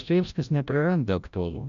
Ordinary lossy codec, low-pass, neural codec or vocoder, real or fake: MP3, 96 kbps; 7.2 kHz; codec, 16 kHz, 0.5 kbps, FreqCodec, larger model; fake